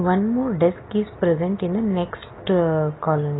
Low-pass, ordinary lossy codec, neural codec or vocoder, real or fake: 7.2 kHz; AAC, 16 kbps; none; real